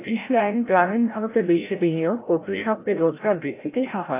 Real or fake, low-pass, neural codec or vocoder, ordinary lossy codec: fake; 3.6 kHz; codec, 16 kHz, 0.5 kbps, FreqCodec, larger model; AAC, 24 kbps